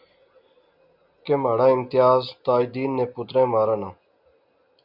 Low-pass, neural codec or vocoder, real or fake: 5.4 kHz; none; real